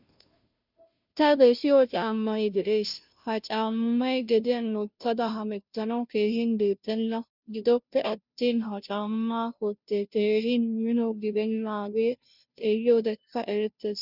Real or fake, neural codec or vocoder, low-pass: fake; codec, 16 kHz, 0.5 kbps, FunCodec, trained on Chinese and English, 25 frames a second; 5.4 kHz